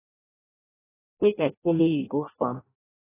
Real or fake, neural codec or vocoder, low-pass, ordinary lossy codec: fake; codec, 16 kHz in and 24 kHz out, 0.6 kbps, FireRedTTS-2 codec; 3.6 kHz; AAC, 16 kbps